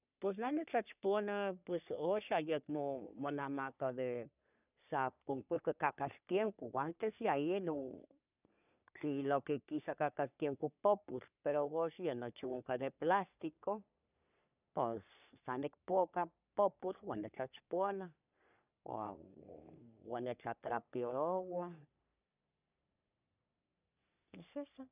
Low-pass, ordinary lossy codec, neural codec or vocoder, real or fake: 3.6 kHz; none; codec, 44.1 kHz, 3.4 kbps, Pupu-Codec; fake